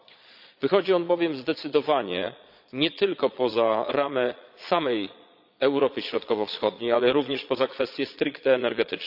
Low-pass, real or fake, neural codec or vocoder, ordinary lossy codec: 5.4 kHz; fake; vocoder, 22.05 kHz, 80 mel bands, Vocos; none